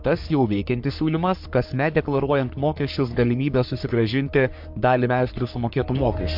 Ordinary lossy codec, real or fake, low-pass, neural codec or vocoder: MP3, 48 kbps; fake; 5.4 kHz; codec, 44.1 kHz, 3.4 kbps, Pupu-Codec